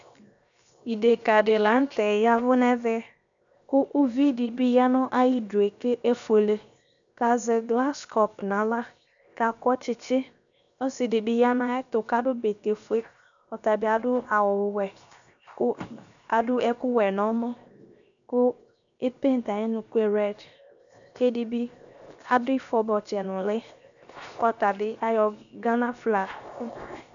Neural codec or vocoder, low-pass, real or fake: codec, 16 kHz, 0.7 kbps, FocalCodec; 7.2 kHz; fake